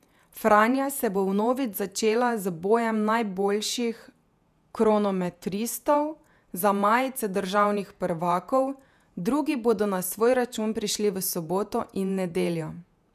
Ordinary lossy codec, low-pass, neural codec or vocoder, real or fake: none; 14.4 kHz; vocoder, 48 kHz, 128 mel bands, Vocos; fake